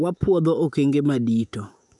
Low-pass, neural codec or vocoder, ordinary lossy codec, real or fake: 10.8 kHz; autoencoder, 48 kHz, 128 numbers a frame, DAC-VAE, trained on Japanese speech; none; fake